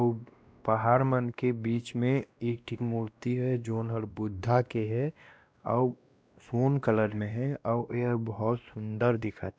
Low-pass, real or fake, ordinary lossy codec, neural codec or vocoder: none; fake; none; codec, 16 kHz, 1 kbps, X-Codec, WavLM features, trained on Multilingual LibriSpeech